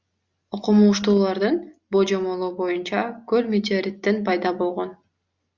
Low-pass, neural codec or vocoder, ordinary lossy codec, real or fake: 7.2 kHz; none; Opus, 64 kbps; real